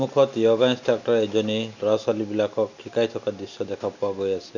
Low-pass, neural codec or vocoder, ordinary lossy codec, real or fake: 7.2 kHz; none; none; real